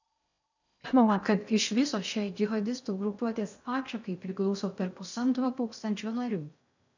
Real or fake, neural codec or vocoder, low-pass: fake; codec, 16 kHz in and 24 kHz out, 0.6 kbps, FocalCodec, streaming, 2048 codes; 7.2 kHz